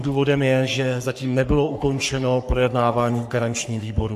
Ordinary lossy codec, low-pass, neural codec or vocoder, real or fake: AAC, 96 kbps; 14.4 kHz; codec, 44.1 kHz, 3.4 kbps, Pupu-Codec; fake